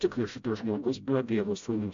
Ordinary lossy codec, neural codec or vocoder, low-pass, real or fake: MP3, 48 kbps; codec, 16 kHz, 0.5 kbps, FreqCodec, smaller model; 7.2 kHz; fake